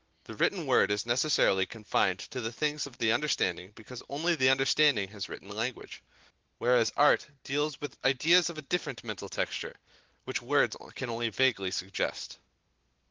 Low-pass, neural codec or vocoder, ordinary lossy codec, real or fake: 7.2 kHz; none; Opus, 16 kbps; real